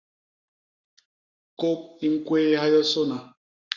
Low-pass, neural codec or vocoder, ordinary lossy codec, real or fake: 7.2 kHz; codec, 44.1 kHz, 7.8 kbps, Pupu-Codec; Opus, 64 kbps; fake